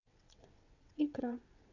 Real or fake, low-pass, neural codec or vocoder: fake; 7.2 kHz; vocoder, 22.05 kHz, 80 mel bands, WaveNeXt